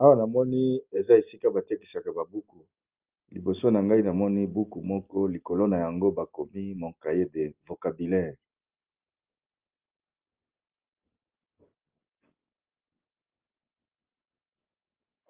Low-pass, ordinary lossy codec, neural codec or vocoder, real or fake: 3.6 kHz; Opus, 24 kbps; none; real